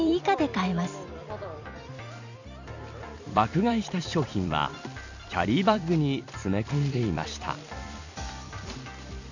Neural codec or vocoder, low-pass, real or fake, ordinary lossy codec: vocoder, 44.1 kHz, 128 mel bands every 256 samples, BigVGAN v2; 7.2 kHz; fake; none